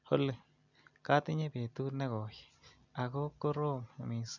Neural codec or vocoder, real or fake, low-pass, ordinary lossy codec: none; real; 7.2 kHz; none